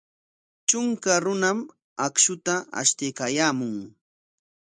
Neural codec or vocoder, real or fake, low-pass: none; real; 9.9 kHz